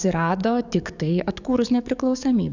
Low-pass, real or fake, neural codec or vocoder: 7.2 kHz; fake; codec, 44.1 kHz, 7.8 kbps, DAC